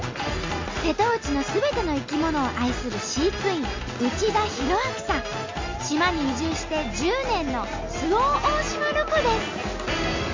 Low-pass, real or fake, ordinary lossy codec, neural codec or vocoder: 7.2 kHz; real; MP3, 48 kbps; none